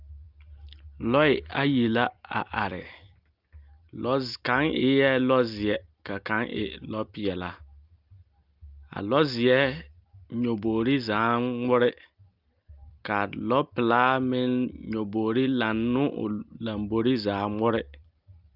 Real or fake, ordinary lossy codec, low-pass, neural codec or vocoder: real; Opus, 24 kbps; 5.4 kHz; none